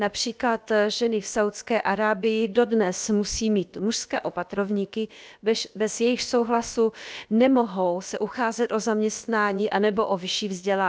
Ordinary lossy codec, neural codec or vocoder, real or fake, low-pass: none; codec, 16 kHz, about 1 kbps, DyCAST, with the encoder's durations; fake; none